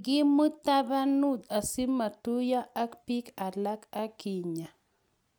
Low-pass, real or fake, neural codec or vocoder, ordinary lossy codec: none; real; none; none